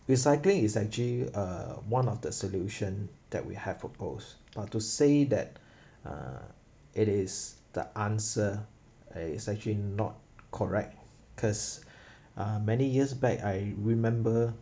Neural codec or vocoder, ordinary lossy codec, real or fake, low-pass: none; none; real; none